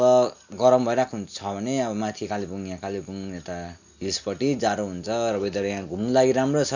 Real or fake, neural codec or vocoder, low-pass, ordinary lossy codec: real; none; 7.2 kHz; none